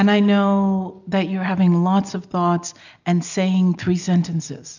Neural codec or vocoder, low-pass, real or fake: none; 7.2 kHz; real